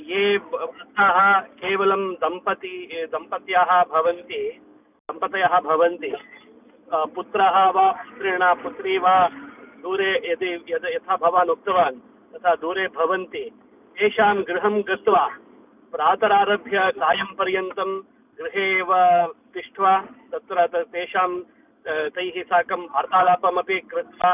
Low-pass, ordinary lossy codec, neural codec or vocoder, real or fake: 3.6 kHz; none; none; real